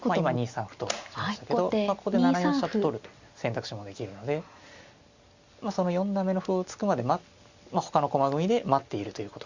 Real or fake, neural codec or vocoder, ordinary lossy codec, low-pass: real; none; Opus, 64 kbps; 7.2 kHz